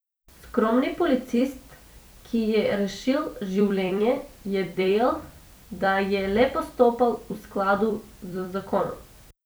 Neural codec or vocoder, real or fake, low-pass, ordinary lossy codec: vocoder, 44.1 kHz, 128 mel bands every 256 samples, BigVGAN v2; fake; none; none